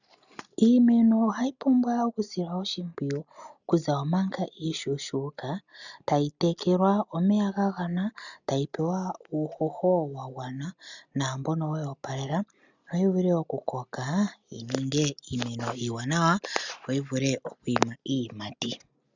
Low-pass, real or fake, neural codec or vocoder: 7.2 kHz; real; none